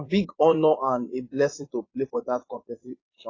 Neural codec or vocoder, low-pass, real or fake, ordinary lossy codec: vocoder, 22.05 kHz, 80 mel bands, Vocos; 7.2 kHz; fake; AAC, 32 kbps